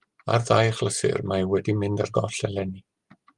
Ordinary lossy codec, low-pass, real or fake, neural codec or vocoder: Opus, 32 kbps; 10.8 kHz; real; none